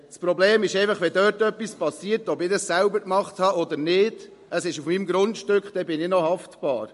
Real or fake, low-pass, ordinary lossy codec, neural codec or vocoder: real; 14.4 kHz; MP3, 48 kbps; none